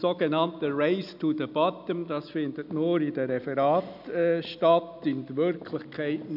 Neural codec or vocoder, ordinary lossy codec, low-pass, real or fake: none; none; 5.4 kHz; real